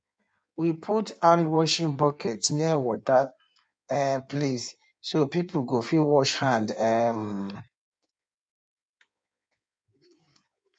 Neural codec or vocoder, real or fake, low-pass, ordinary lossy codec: codec, 16 kHz in and 24 kHz out, 1.1 kbps, FireRedTTS-2 codec; fake; 9.9 kHz; none